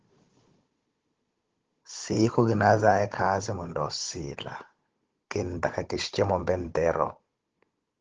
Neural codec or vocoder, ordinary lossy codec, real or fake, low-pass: codec, 16 kHz, 16 kbps, FunCodec, trained on Chinese and English, 50 frames a second; Opus, 16 kbps; fake; 7.2 kHz